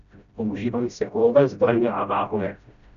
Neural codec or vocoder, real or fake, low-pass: codec, 16 kHz, 0.5 kbps, FreqCodec, smaller model; fake; 7.2 kHz